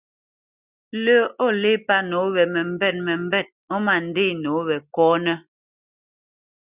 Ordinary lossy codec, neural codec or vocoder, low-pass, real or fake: Opus, 64 kbps; none; 3.6 kHz; real